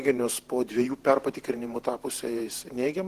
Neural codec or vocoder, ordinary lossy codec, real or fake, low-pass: vocoder, 48 kHz, 128 mel bands, Vocos; Opus, 16 kbps; fake; 14.4 kHz